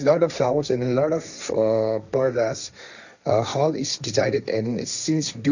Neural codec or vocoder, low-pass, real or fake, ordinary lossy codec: codec, 16 kHz, 1.1 kbps, Voila-Tokenizer; 7.2 kHz; fake; none